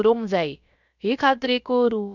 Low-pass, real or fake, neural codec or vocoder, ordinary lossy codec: 7.2 kHz; fake; codec, 16 kHz, about 1 kbps, DyCAST, with the encoder's durations; none